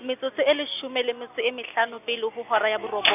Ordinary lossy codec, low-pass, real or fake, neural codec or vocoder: none; 3.6 kHz; real; none